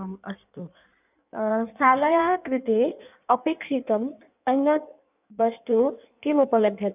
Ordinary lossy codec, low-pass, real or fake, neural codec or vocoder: none; 3.6 kHz; fake; codec, 16 kHz in and 24 kHz out, 1.1 kbps, FireRedTTS-2 codec